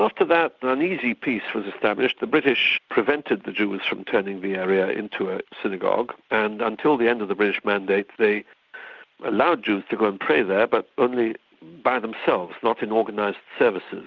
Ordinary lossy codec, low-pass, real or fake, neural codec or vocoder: Opus, 24 kbps; 7.2 kHz; real; none